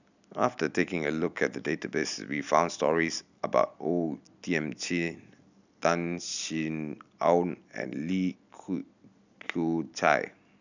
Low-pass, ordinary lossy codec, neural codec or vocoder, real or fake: 7.2 kHz; none; none; real